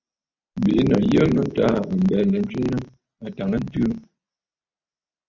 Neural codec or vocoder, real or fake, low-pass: none; real; 7.2 kHz